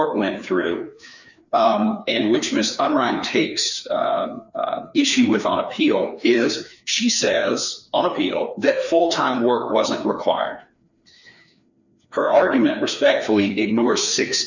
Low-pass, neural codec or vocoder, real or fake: 7.2 kHz; codec, 16 kHz, 2 kbps, FreqCodec, larger model; fake